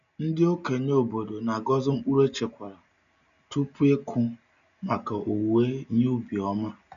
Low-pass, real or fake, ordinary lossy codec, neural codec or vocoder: 7.2 kHz; real; none; none